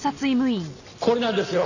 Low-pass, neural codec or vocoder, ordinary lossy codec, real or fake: 7.2 kHz; none; none; real